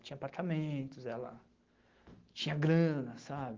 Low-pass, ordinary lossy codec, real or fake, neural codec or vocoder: 7.2 kHz; Opus, 16 kbps; fake; autoencoder, 48 kHz, 128 numbers a frame, DAC-VAE, trained on Japanese speech